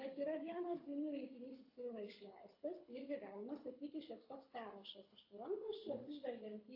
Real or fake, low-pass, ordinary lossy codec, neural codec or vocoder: fake; 5.4 kHz; AAC, 48 kbps; codec, 24 kHz, 6 kbps, HILCodec